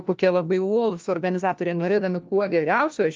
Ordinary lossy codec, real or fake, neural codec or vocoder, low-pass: Opus, 32 kbps; fake; codec, 16 kHz, 1 kbps, FunCodec, trained on LibriTTS, 50 frames a second; 7.2 kHz